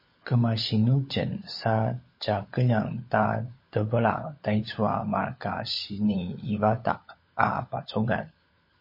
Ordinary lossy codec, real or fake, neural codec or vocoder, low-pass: MP3, 24 kbps; fake; codec, 16 kHz, 16 kbps, FunCodec, trained on LibriTTS, 50 frames a second; 5.4 kHz